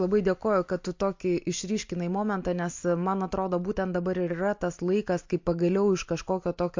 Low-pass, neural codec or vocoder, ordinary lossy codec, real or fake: 7.2 kHz; none; MP3, 48 kbps; real